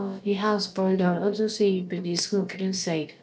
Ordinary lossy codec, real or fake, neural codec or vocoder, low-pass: none; fake; codec, 16 kHz, about 1 kbps, DyCAST, with the encoder's durations; none